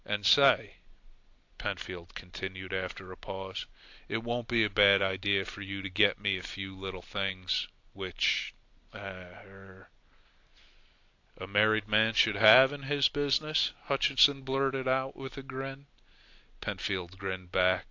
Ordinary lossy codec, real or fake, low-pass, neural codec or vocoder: AAC, 48 kbps; real; 7.2 kHz; none